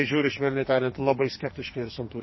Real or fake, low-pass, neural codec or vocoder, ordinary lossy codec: fake; 7.2 kHz; codec, 44.1 kHz, 2.6 kbps, SNAC; MP3, 24 kbps